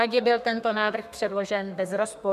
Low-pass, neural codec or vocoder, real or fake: 14.4 kHz; codec, 32 kHz, 1.9 kbps, SNAC; fake